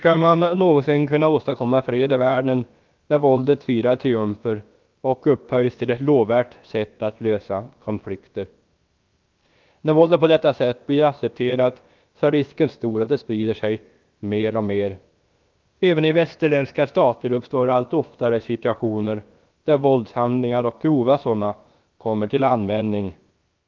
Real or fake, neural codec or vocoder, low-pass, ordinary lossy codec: fake; codec, 16 kHz, about 1 kbps, DyCAST, with the encoder's durations; 7.2 kHz; Opus, 32 kbps